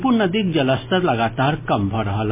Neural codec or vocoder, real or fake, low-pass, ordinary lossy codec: none; real; 3.6 kHz; MP3, 24 kbps